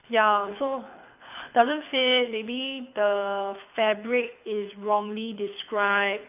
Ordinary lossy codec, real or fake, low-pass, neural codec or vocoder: none; fake; 3.6 kHz; codec, 24 kHz, 6 kbps, HILCodec